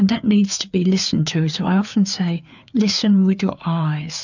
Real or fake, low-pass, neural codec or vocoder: fake; 7.2 kHz; codec, 16 kHz, 4 kbps, FreqCodec, larger model